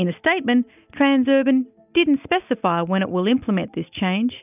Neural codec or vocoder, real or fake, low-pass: none; real; 3.6 kHz